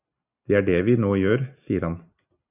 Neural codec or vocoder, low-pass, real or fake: none; 3.6 kHz; real